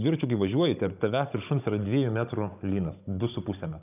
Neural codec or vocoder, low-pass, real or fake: codec, 16 kHz, 16 kbps, FunCodec, trained on Chinese and English, 50 frames a second; 3.6 kHz; fake